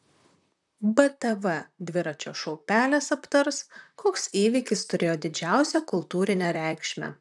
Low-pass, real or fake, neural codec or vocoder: 10.8 kHz; fake; vocoder, 44.1 kHz, 128 mel bands, Pupu-Vocoder